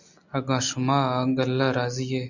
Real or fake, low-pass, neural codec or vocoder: real; 7.2 kHz; none